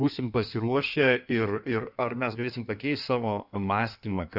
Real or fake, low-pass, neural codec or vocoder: fake; 5.4 kHz; codec, 16 kHz in and 24 kHz out, 1.1 kbps, FireRedTTS-2 codec